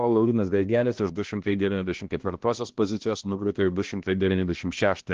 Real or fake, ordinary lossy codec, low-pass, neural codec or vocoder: fake; Opus, 16 kbps; 7.2 kHz; codec, 16 kHz, 1 kbps, X-Codec, HuBERT features, trained on balanced general audio